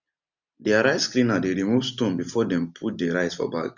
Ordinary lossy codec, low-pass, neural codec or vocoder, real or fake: none; 7.2 kHz; none; real